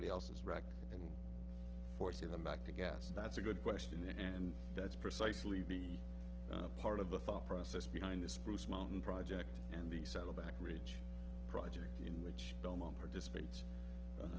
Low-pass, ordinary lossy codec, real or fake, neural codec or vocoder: 7.2 kHz; Opus, 16 kbps; real; none